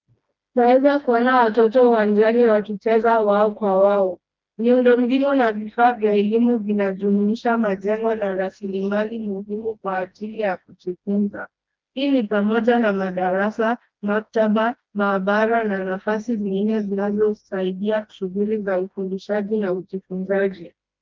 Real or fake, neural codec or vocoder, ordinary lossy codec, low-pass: fake; codec, 16 kHz, 1 kbps, FreqCodec, smaller model; Opus, 24 kbps; 7.2 kHz